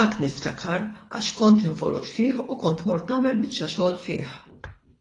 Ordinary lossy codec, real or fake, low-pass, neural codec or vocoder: AAC, 32 kbps; fake; 10.8 kHz; codec, 24 kHz, 3 kbps, HILCodec